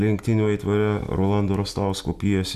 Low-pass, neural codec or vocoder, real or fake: 14.4 kHz; none; real